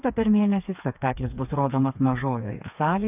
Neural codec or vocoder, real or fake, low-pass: codec, 16 kHz, 4 kbps, FreqCodec, smaller model; fake; 3.6 kHz